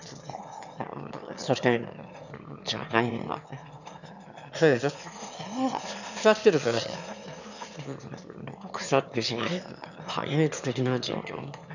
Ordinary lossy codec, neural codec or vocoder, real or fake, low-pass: none; autoencoder, 22.05 kHz, a latent of 192 numbers a frame, VITS, trained on one speaker; fake; 7.2 kHz